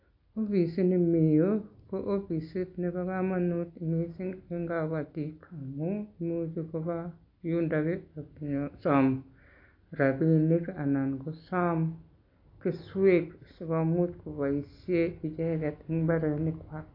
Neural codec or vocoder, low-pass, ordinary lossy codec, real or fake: none; 5.4 kHz; AAC, 32 kbps; real